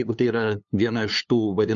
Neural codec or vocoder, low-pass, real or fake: codec, 16 kHz, 2 kbps, FunCodec, trained on LibriTTS, 25 frames a second; 7.2 kHz; fake